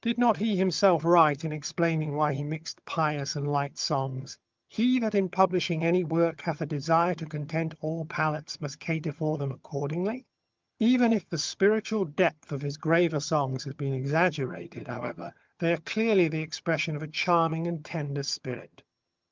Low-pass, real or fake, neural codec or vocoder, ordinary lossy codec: 7.2 kHz; fake; vocoder, 22.05 kHz, 80 mel bands, HiFi-GAN; Opus, 32 kbps